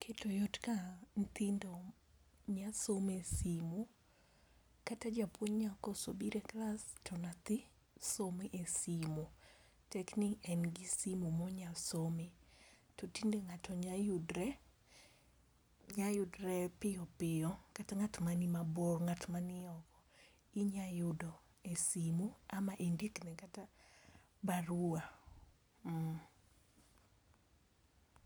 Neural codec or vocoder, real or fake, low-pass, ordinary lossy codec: none; real; none; none